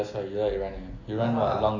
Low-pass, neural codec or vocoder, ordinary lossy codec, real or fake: 7.2 kHz; none; AAC, 48 kbps; real